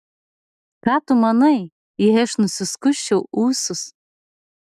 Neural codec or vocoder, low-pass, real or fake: none; 14.4 kHz; real